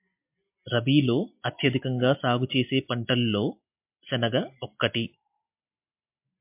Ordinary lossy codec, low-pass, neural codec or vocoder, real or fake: MP3, 32 kbps; 3.6 kHz; none; real